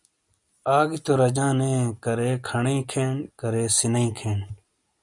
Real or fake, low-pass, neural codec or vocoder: real; 10.8 kHz; none